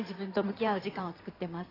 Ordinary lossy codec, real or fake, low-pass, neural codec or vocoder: AAC, 24 kbps; fake; 5.4 kHz; codec, 16 kHz in and 24 kHz out, 2.2 kbps, FireRedTTS-2 codec